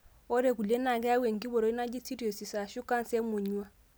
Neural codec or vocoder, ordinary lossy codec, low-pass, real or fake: none; none; none; real